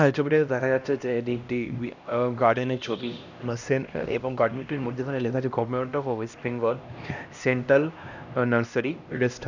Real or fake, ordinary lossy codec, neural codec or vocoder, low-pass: fake; none; codec, 16 kHz, 1 kbps, X-Codec, HuBERT features, trained on LibriSpeech; 7.2 kHz